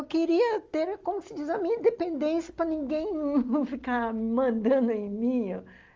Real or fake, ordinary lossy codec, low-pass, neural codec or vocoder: real; Opus, 32 kbps; 7.2 kHz; none